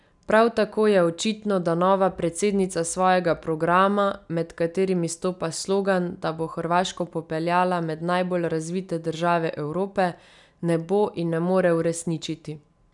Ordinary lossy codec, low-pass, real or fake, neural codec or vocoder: none; 10.8 kHz; real; none